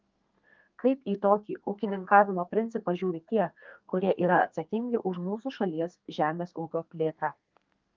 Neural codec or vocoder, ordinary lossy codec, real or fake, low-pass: codec, 32 kHz, 1.9 kbps, SNAC; Opus, 24 kbps; fake; 7.2 kHz